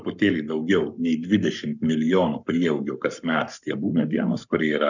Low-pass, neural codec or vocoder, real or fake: 7.2 kHz; codec, 44.1 kHz, 7.8 kbps, Pupu-Codec; fake